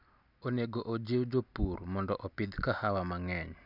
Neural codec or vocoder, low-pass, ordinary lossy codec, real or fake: none; 5.4 kHz; none; real